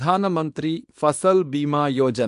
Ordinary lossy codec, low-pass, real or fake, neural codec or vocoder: AAC, 64 kbps; 10.8 kHz; fake; codec, 24 kHz, 0.9 kbps, WavTokenizer, small release